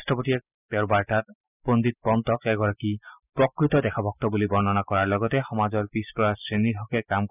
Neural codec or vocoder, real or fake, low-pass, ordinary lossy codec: none; real; 3.6 kHz; none